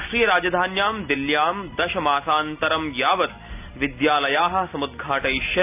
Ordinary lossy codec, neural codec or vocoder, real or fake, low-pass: AAC, 32 kbps; none; real; 3.6 kHz